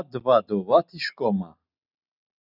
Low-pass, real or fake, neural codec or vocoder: 5.4 kHz; real; none